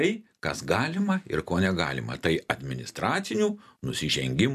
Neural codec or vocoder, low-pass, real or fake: none; 14.4 kHz; real